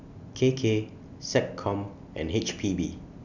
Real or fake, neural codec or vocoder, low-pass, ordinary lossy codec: real; none; 7.2 kHz; none